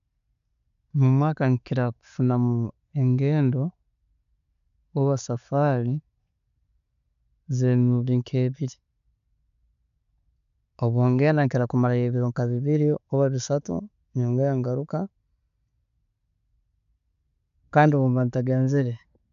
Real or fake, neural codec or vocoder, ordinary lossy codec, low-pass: real; none; none; 7.2 kHz